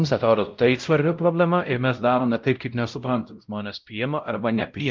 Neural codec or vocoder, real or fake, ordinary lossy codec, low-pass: codec, 16 kHz, 0.5 kbps, X-Codec, WavLM features, trained on Multilingual LibriSpeech; fake; Opus, 32 kbps; 7.2 kHz